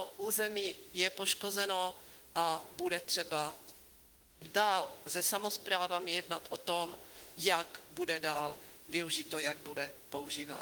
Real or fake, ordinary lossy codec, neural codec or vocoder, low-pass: fake; Opus, 24 kbps; autoencoder, 48 kHz, 32 numbers a frame, DAC-VAE, trained on Japanese speech; 19.8 kHz